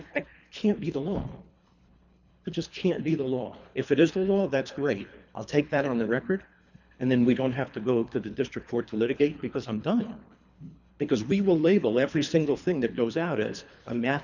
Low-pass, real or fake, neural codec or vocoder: 7.2 kHz; fake; codec, 24 kHz, 3 kbps, HILCodec